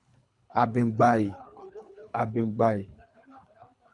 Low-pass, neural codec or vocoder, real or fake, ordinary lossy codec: 10.8 kHz; codec, 24 kHz, 3 kbps, HILCodec; fake; MP3, 64 kbps